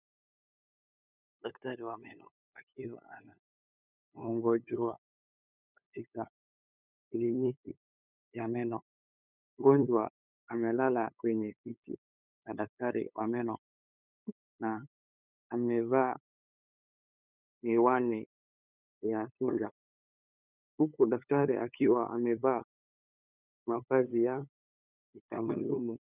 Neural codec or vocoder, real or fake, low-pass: codec, 16 kHz, 8 kbps, FunCodec, trained on LibriTTS, 25 frames a second; fake; 3.6 kHz